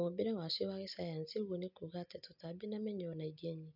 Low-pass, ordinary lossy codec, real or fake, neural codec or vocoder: 5.4 kHz; none; real; none